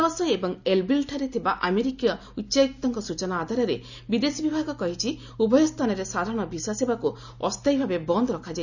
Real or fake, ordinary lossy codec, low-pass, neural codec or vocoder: real; none; 7.2 kHz; none